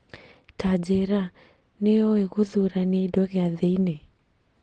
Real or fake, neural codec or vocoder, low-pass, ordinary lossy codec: real; none; 9.9 kHz; Opus, 16 kbps